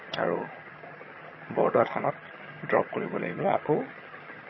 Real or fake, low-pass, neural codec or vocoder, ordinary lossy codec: fake; 7.2 kHz; vocoder, 22.05 kHz, 80 mel bands, HiFi-GAN; MP3, 24 kbps